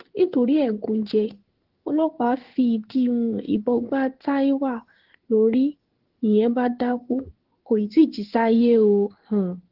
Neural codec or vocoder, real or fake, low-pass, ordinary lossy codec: codec, 16 kHz in and 24 kHz out, 1 kbps, XY-Tokenizer; fake; 5.4 kHz; Opus, 16 kbps